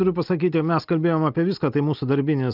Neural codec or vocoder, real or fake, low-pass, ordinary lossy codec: none; real; 5.4 kHz; Opus, 24 kbps